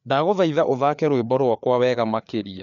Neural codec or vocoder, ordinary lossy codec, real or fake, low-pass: codec, 16 kHz, 4 kbps, FreqCodec, larger model; none; fake; 7.2 kHz